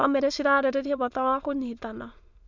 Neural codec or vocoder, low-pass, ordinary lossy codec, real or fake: autoencoder, 22.05 kHz, a latent of 192 numbers a frame, VITS, trained on many speakers; 7.2 kHz; MP3, 64 kbps; fake